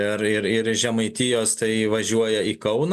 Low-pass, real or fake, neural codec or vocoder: 14.4 kHz; real; none